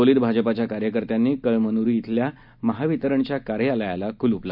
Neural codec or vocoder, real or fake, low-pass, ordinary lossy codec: none; real; 5.4 kHz; none